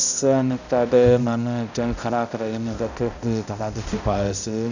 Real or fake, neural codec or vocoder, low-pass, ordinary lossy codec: fake; codec, 16 kHz, 0.5 kbps, X-Codec, HuBERT features, trained on balanced general audio; 7.2 kHz; none